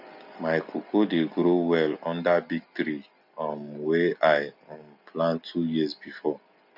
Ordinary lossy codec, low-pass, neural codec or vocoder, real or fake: none; 5.4 kHz; none; real